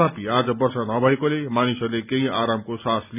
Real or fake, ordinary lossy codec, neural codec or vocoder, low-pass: real; none; none; 3.6 kHz